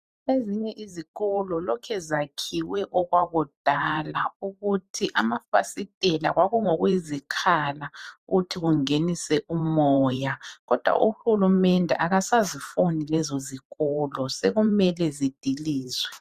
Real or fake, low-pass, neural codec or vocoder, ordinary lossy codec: fake; 14.4 kHz; vocoder, 44.1 kHz, 128 mel bands every 512 samples, BigVGAN v2; Opus, 64 kbps